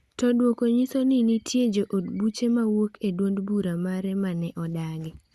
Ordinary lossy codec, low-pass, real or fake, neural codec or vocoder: Opus, 64 kbps; 14.4 kHz; real; none